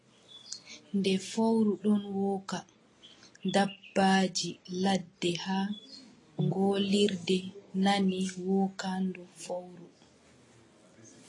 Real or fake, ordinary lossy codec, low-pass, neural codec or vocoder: real; AAC, 32 kbps; 10.8 kHz; none